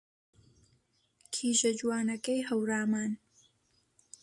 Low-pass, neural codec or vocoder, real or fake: 10.8 kHz; none; real